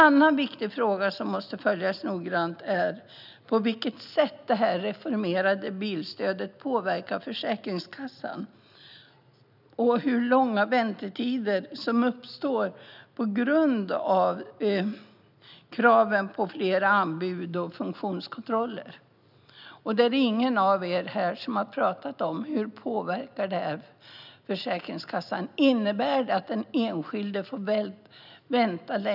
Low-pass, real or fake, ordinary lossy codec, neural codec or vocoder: 5.4 kHz; real; none; none